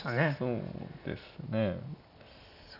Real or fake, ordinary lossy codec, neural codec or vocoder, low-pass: real; none; none; 5.4 kHz